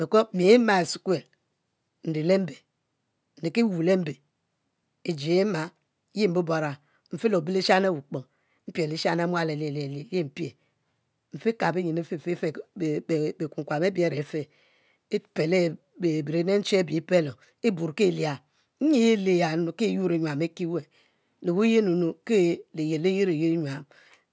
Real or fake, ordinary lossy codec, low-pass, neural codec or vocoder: real; none; none; none